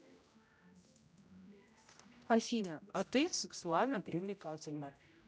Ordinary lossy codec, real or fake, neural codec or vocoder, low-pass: none; fake; codec, 16 kHz, 0.5 kbps, X-Codec, HuBERT features, trained on general audio; none